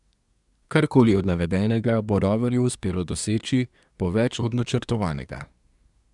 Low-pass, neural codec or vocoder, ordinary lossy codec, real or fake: 10.8 kHz; codec, 24 kHz, 1 kbps, SNAC; none; fake